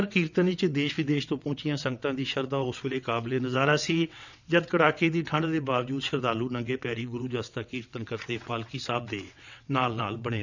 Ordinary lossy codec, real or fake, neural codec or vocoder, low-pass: none; fake; vocoder, 22.05 kHz, 80 mel bands, WaveNeXt; 7.2 kHz